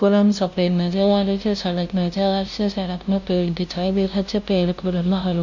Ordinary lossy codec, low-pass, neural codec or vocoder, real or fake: none; 7.2 kHz; codec, 16 kHz, 0.5 kbps, FunCodec, trained on LibriTTS, 25 frames a second; fake